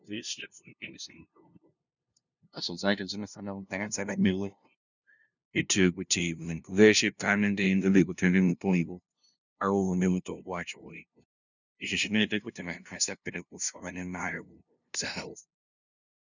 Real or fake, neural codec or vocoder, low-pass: fake; codec, 16 kHz, 0.5 kbps, FunCodec, trained on LibriTTS, 25 frames a second; 7.2 kHz